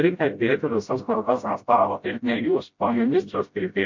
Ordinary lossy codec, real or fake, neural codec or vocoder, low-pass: MP3, 48 kbps; fake; codec, 16 kHz, 0.5 kbps, FreqCodec, smaller model; 7.2 kHz